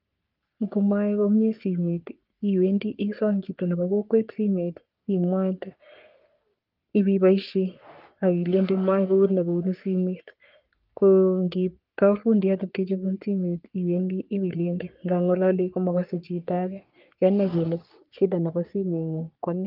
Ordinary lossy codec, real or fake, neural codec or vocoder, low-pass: Opus, 32 kbps; fake; codec, 44.1 kHz, 3.4 kbps, Pupu-Codec; 5.4 kHz